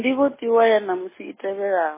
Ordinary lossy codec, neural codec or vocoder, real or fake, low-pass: MP3, 16 kbps; none; real; 3.6 kHz